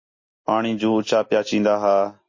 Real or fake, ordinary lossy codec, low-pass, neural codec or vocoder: real; MP3, 32 kbps; 7.2 kHz; none